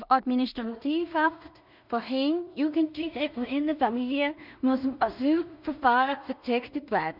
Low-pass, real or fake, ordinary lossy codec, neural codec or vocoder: 5.4 kHz; fake; none; codec, 16 kHz in and 24 kHz out, 0.4 kbps, LongCat-Audio-Codec, two codebook decoder